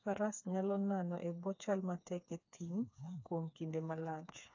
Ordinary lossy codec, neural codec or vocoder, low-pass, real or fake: AAC, 48 kbps; codec, 16 kHz, 4 kbps, FreqCodec, smaller model; 7.2 kHz; fake